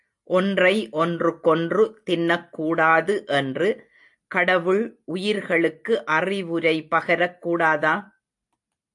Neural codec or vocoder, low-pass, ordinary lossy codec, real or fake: none; 10.8 kHz; AAC, 64 kbps; real